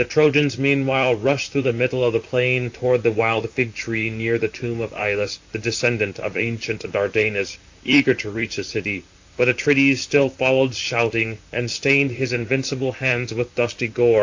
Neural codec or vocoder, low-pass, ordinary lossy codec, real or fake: vocoder, 44.1 kHz, 128 mel bands, Pupu-Vocoder; 7.2 kHz; AAC, 48 kbps; fake